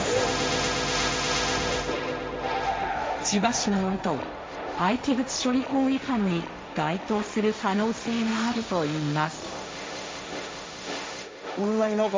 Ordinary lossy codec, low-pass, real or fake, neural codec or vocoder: none; none; fake; codec, 16 kHz, 1.1 kbps, Voila-Tokenizer